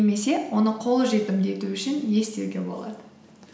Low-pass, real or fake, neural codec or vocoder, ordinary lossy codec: none; real; none; none